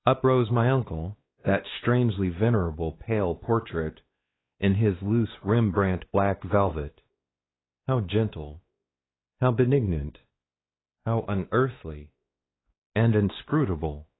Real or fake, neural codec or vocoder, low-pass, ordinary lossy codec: fake; codec, 16 kHz, 2 kbps, X-Codec, WavLM features, trained on Multilingual LibriSpeech; 7.2 kHz; AAC, 16 kbps